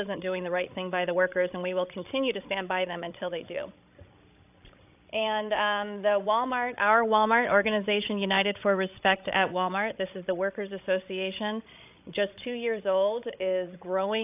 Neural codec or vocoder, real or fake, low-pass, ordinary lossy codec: codec, 16 kHz, 16 kbps, FreqCodec, larger model; fake; 3.6 kHz; AAC, 32 kbps